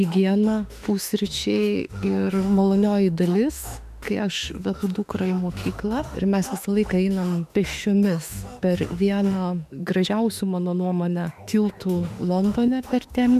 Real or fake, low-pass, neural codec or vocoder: fake; 14.4 kHz; autoencoder, 48 kHz, 32 numbers a frame, DAC-VAE, trained on Japanese speech